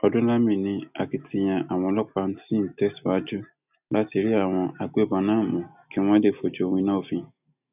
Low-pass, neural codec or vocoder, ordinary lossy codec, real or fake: 3.6 kHz; none; none; real